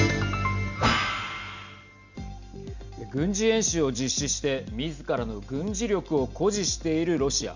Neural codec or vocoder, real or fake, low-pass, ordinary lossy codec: none; real; 7.2 kHz; none